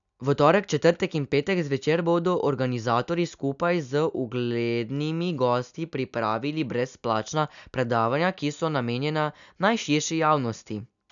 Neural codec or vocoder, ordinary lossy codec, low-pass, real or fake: none; none; 7.2 kHz; real